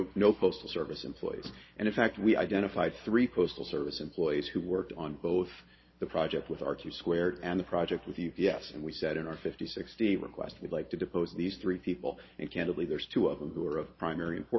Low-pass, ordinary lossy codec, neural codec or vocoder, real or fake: 7.2 kHz; MP3, 24 kbps; none; real